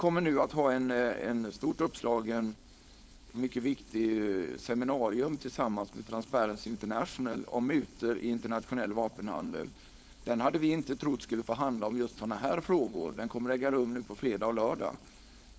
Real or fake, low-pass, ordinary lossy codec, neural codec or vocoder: fake; none; none; codec, 16 kHz, 4.8 kbps, FACodec